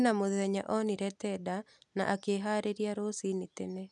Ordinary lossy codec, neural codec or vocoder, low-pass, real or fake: none; none; 10.8 kHz; real